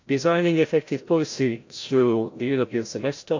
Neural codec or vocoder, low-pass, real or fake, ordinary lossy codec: codec, 16 kHz, 0.5 kbps, FreqCodec, larger model; 7.2 kHz; fake; AAC, 48 kbps